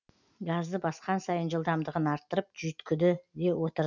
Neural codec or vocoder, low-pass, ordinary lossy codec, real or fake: none; 7.2 kHz; none; real